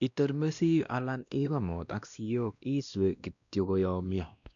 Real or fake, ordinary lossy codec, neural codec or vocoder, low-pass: fake; none; codec, 16 kHz, 1 kbps, X-Codec, WavLM features, trained on Multilingual LibriSpeech; 7.2 kHz